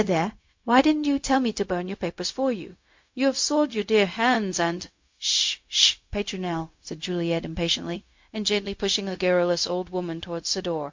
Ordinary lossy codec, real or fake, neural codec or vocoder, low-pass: MP3, 48 kbps; fake; codec, 16 kHz, 0.4 kbps, LongCat-Audio-Codec; 7.2 kHz